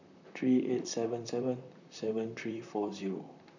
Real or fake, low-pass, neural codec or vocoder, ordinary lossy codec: fake; 7.2 kHz; vocoder, 44.1 kHz, 128 mel bands, Pupu-Vocoder; none